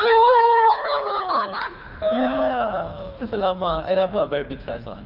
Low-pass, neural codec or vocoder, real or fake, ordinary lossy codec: 5.4 kHz; codec, 24 kHz, 3 kbps, HILCodec; fake; none